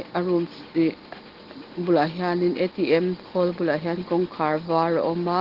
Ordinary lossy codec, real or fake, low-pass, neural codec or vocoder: Opus, 16 kbps; real; 5.4 kHz; none